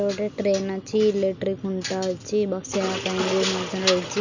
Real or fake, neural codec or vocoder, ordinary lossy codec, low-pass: real; none; none; 7.2 kHz